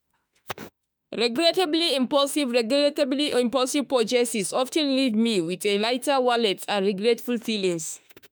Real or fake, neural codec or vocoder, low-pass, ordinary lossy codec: fake; autoencoder, 48 kHz, 32 numbers a frame, DAC-VAE, trained on Japanese speech; none; none